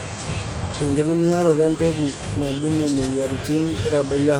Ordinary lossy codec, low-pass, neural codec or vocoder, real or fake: none; none; codec, 44.1 kHz, 2.6 kbps, DAC; fake